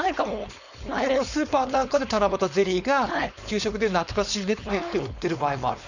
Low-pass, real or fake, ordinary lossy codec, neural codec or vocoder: 7.2 kHz; fake; none; codec, 16 kHz, 4.8 kbps, FACodec